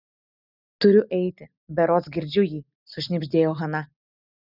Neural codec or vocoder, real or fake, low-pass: none; real; 5.4 kHz